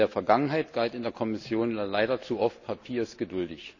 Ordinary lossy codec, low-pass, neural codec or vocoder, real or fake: none; 7.2 kHz; none; real